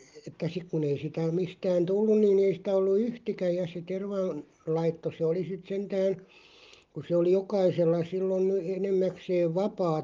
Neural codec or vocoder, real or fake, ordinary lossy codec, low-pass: none; real; Opus, 32 kbps; 7.2 kHz